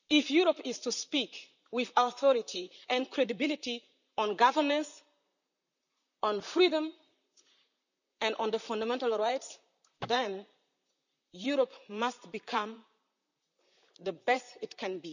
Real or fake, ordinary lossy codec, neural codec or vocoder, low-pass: fake; none; vocoder, 44.1 kHz, 128 mel bands, Pupu-Vocoder; 7.2 kHz